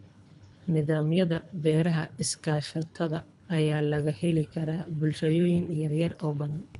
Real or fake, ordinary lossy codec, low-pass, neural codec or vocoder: fake; none; 10.8 kHz; codec, 24 kHz, 3 kbps, HILCodec